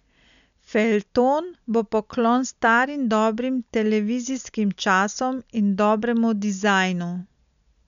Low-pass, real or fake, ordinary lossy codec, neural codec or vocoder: 7.2 kHz; real; none; none